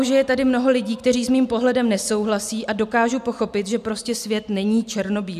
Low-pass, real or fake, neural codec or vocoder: 14.4 kHz; real; none